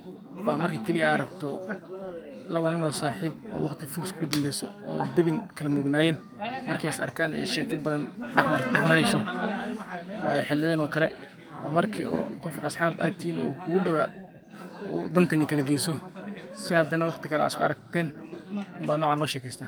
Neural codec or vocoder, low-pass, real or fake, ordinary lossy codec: codec, 44.1 kHz, 2.6 kbps, SNAC; none; fake; none